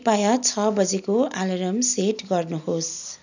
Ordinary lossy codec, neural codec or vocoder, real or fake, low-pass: none; none; real; 7.2 kHz